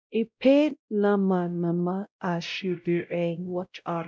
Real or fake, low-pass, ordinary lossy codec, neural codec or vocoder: fake; none; none; codec, 16 kHz, 0.5 kbps, X-Codec, WavLM features, trained on Multilingual LibriSpeech